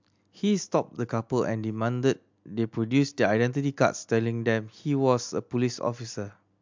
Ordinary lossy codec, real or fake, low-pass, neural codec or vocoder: MP3, 64 kbps; real; 7.2 kHz; none